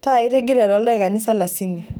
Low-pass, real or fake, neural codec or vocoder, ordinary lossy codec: none; fake; codec, 44.1 kHz, 2.6 kbps, SNAC; none